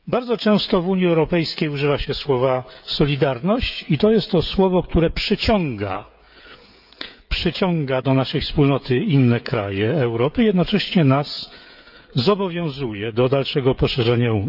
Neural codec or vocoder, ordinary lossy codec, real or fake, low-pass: codec, 16 kHz, 16 kbps, FreqCodec, smaller model; none; fake; 5.4 kHz